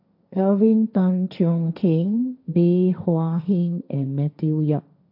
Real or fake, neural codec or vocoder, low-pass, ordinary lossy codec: fake; codec, 16 kHz, 1.1 kbps, Voila-Tokenizer; 5.4 kHz; AAC, 48 kbps